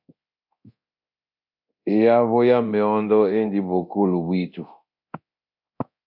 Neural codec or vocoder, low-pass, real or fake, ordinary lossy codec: codec, 24 kHz, 0.9 kbps, DualCodec; 5.4 kHz; fake; MP3, 48 kbps